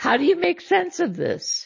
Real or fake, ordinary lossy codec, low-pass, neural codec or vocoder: real; MP3, 32 kbps; 7.2 kHz; none